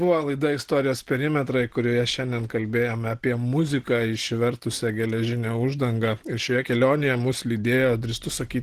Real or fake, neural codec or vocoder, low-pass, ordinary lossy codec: real; none; 14.4 kHz; Opus, 16 kbps